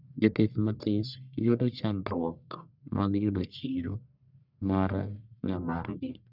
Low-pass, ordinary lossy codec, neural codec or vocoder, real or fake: 5.4 kHz; none; codec, 44.1 kHz, 1.7 kbps, Pupu-Codec; fake